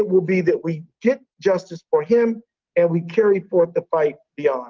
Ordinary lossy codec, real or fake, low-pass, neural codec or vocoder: Opus, 32 kbps; real; 7.2 kHz; none